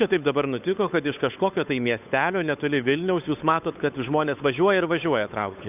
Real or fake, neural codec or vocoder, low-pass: fake; codec, 16 kHz, 8 kbps, FunCodec, trained on Chinese and English, 25 frames a second; 3.6 kHz